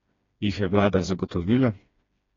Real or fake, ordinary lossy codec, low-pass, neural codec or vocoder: fake; AAC, 32 kbps; 7.2 kHz; codec, 16 kHz, 2 kbps, FreqCodec, smaller model